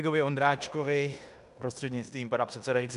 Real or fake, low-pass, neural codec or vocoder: fake; 10.8 kHz; codec, 16 kHz in and 24 kHz out, 0.9 kbps, LongCat-Audio-Codec, fine tuned four codebook decoder